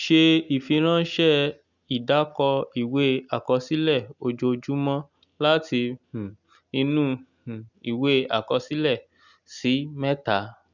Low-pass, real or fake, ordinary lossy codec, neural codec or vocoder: 7.2 kHz; real; none; none